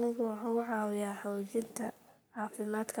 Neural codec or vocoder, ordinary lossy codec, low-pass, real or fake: codec, 44.1 kHz, 3.4 kbps, Pupu-Codec; none; none; fake